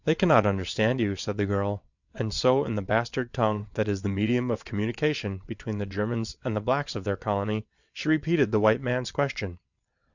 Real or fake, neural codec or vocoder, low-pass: fake; vocoder, 22.05 kHz, 80 mel bands, WaveNeXt; 7.2 kHz